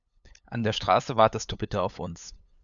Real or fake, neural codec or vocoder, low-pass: fake; codec, 16 kHz, 8 kbps, FunCodec, trained on LibriTTS, 25 frames a second; 7.2 kHz